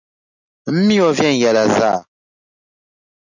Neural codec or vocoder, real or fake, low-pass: none; real; 7.2 kHz